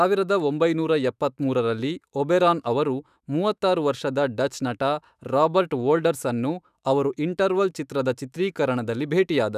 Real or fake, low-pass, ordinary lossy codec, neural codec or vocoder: fake; 14.4 kHz; none; autoencoder, 48 kHz, 128 numbers a frame, DAC-VAE, trained on Japanese speech